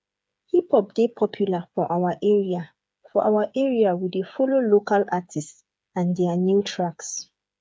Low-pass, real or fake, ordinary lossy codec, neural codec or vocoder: none; fake; none; codec, 16 kHz, 8 kbps, FreqCodec, smaller model